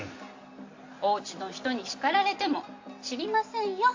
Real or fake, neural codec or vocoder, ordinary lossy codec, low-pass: real; none; AAC, 48 kbps; 7.2 kHz